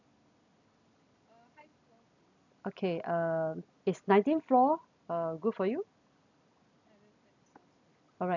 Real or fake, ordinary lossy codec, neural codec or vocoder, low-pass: real; none; none; 7.2 kHz